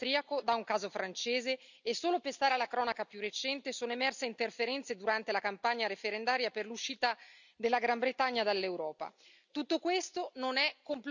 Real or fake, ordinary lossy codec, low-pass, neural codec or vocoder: real; none; 7.2 kHz; none